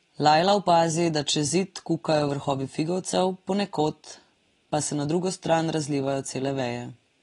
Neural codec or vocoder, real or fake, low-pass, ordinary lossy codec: none; real; 10.8 kHz; AAC, 32 kbps